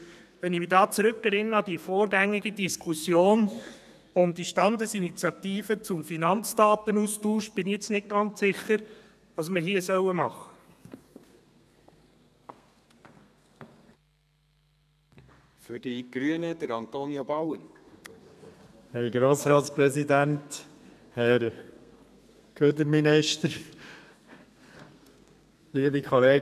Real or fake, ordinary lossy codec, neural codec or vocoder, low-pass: fake; none; codec, 32 kHz, 1.9 kbps, SNAC; 14.4 kHz